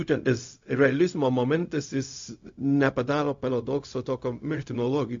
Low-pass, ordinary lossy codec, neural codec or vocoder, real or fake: 7.2 kHz; MP3, 48 kbps; codec, 16 kHz, 0.4 kbps, LongCat-Audio-Codec; fake